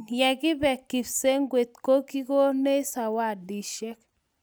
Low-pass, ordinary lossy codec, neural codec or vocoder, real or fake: none; none; none; real